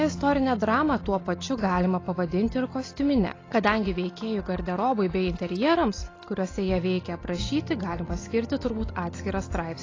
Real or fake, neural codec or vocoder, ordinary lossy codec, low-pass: real; none; AAC, 32 kbps; 7.2 kHz